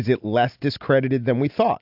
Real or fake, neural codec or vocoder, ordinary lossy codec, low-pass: real; none; AAC, 48 kbps; 5.4 kHz